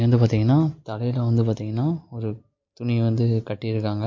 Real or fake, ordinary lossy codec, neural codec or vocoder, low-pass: real; MP3, 48 kbps; none; 7.2 kHz